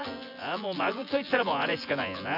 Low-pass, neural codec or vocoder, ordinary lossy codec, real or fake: 5.4 kHz; vocoder, 24 kHz, 100 mel bands, Vocos; none; fake